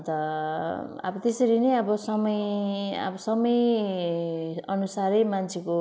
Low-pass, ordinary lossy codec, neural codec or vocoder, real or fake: none; none; none; real